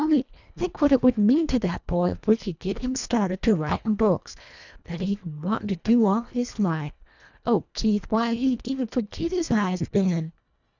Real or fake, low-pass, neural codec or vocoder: fake; 7.2 kHz; codec, 24 kHz, 1.5 kbps, HILCodec